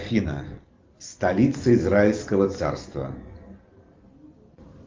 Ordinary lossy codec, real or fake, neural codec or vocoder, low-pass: Opus, 32 kbps; real; none; 7.2 kHz